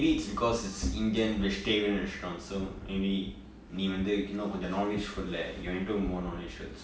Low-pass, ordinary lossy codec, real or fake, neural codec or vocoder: none; none; real; none